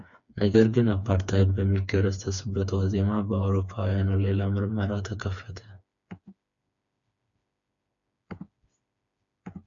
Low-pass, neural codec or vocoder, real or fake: 7.2 kHz; codec, 16 kHz, 4 kbps, FreqCodec, smaller model; fake